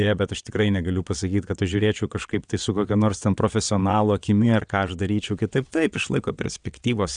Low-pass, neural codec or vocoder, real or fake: 9.9 kHz; vocoder, 22.05 kHz, 80 mel bands, WaveNeXt; fake